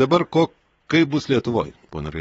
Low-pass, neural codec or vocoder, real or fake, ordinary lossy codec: 9.9 kHz; vocoder, 22.05 kHz, 80 mel bands, WaveNeXt; fake; AAC, 24 kbps